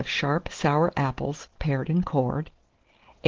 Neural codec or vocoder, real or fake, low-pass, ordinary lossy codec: none; real; 7.2 kHz; Opus, 16 kbps